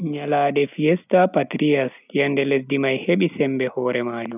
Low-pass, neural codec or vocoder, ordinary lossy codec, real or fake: 3.6 kHz; none; none; real